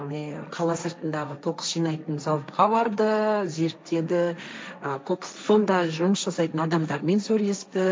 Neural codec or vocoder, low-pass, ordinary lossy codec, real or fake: codec, 16 kHz, 1.1 kbps, Voila-Tokenizer; none; none; fake